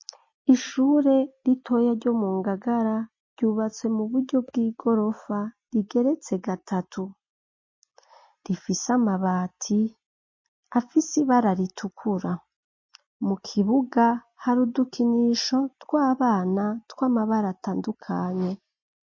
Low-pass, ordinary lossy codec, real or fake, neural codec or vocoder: 7.2 kHz; MP3, 32 kbps; real; none